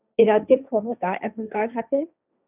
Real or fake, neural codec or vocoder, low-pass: fake; codec, 16 kHz, 1.1 kbps, Voila-Tokenizer; 3.6 kHz